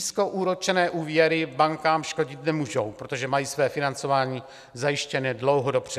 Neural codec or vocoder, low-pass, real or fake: none; 14.4 kHz; real